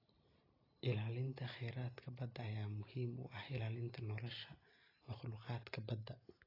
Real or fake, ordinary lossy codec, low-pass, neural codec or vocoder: real; AAC, 24 kbps; 5.4 kHz; none